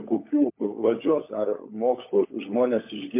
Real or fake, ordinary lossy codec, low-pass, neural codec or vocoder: fake; MP3, 24 kbps; 3.6 kHz; codec, 16 kHz, 16 kbps, FunCodec, trained on LibriTTS, 50 frames a second